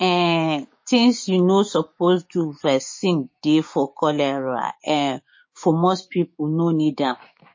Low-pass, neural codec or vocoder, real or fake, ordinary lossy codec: 7.2 kHz; codec, 16 kHz, 6 kbps, DAC; fake; MP3, 32 kbps